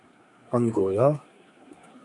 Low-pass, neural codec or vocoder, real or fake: 10.8 kHz; codec, 24 kHz, 1 kbps, SNAC; fake